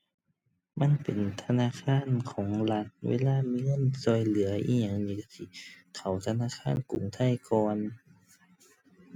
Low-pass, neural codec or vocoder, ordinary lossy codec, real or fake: 19.8 kHz; none; none; real